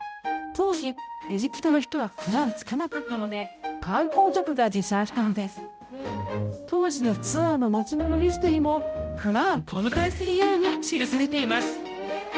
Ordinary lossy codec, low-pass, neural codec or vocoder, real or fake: none; none; codec, 16 kHz, 0.5 kbps, X-Codec, HuBERT features, trained on balanced general audio; fake